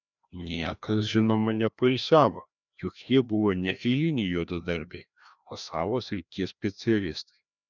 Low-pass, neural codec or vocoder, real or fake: 7.2 kHz; codec, 16 kHz, 1 kbps, FreqCodec, larger model; fake